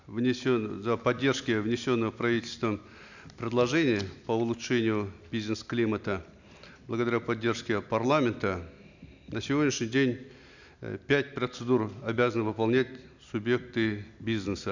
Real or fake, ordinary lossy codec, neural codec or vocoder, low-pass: real; none; none; 7.2 kHz